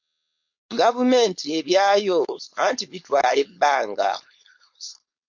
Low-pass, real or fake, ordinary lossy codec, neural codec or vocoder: 7.2 kHz; fake; MP3, 48 kbps; codec, 16 kHz, 4.8 kbps, FACodec